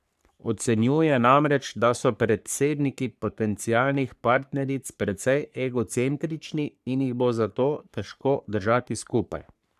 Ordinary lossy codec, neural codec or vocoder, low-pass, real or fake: none; codec, 44.1 kHz, 3.4 kbps, Pupu-Codec; 14.4 kHz; fake